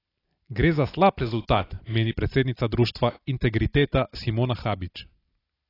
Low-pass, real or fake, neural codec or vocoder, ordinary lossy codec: 5.4 kHz; real; none; AAC, 24 kbps